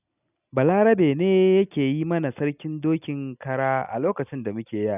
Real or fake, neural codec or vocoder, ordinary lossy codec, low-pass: real; none; none; 3.6 kHz